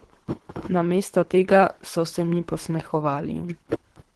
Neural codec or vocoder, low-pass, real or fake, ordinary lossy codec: codec, 24 kHz, 3 kbps, HILCodec; 10.8 kHz; fake; Opus, 16 kbps